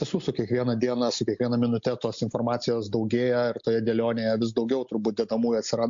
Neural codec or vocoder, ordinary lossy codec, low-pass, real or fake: none; MP3, 48 kbps; 7.2 kHz; real